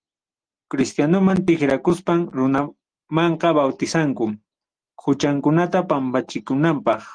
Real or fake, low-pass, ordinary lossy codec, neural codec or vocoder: real; 9.9 kHz; Opus, 24 kbps; none